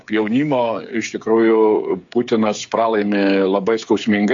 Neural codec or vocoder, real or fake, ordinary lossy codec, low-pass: codec, 16 kHz, 8 kbps, FreqCodec, smaller model; fake; AAC, 64 kbps; 7.2 kHz